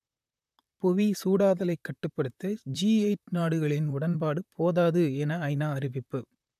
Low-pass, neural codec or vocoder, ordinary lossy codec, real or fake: 14.4 kHz; vocoder, 44.1 kHz, 128 mel bands, Pupu-Vocoder; none; fake